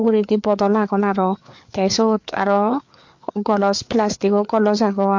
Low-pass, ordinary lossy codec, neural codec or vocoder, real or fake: 7.2 kHz; MP3, 48 kbps; codec, 16 kHz, 4 kbps, FreqCodec, larger model; fake